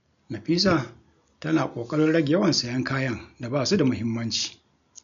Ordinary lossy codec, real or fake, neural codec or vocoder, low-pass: MP3, 96 kbps; real; none; 7.2 kHz